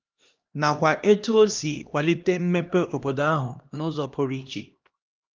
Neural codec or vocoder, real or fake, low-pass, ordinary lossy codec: codec, 16 kHz, 1 kbps, X-Codec, HuBERT features, trained on LibriSpeech; fake; 7.2 kHz; Opus, 24 kbps